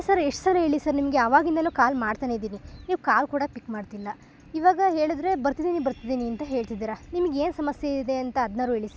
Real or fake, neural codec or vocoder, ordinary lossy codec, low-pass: real; none; none; none